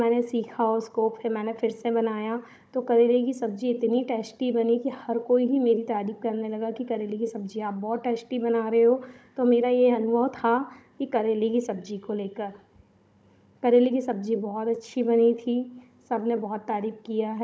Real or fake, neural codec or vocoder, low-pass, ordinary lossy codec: fake; codec, 16 kHz, 16 kbps, FunCodec, trained on Chinese and English, 50 frames a second; none; none